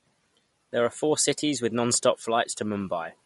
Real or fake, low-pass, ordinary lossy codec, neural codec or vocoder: real; 19.8 kHz; MP3, 48 kbps; none